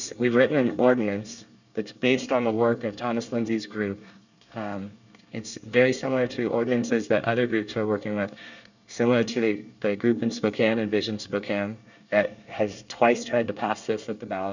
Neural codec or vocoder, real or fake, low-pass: codec, 24 kHz, 1 kbps, SNAC; fake; 7.2 kHz